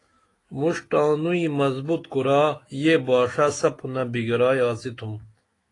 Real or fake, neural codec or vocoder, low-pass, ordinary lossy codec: fake; autoencoder, 48 kHz, 128 numbers a frame, DAC-VAE, trained on Japanese speech; 10.8 kHz; AAC, 32 kbps